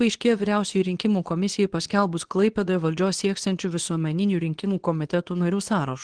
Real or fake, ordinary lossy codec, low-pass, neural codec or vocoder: fake; Opus, 16 kbps; 9.9 kHz; codec, 24 kHz, 0.9 kbps, WavTokenizer, medium speech release version 2